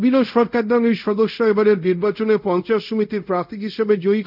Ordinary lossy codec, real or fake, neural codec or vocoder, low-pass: none; fake; codec, 24 kHz, 0.5 kbps, DualCodec; 5.4 kHz